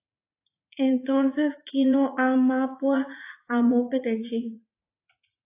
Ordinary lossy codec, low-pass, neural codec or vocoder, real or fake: AAC, 24 kbps; 3.6 kHz; vocoder, 44.1 kHz, 80 mel bands, Vocos; fake